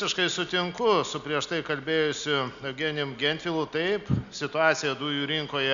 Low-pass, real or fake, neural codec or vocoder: 7.2 kHz; real; none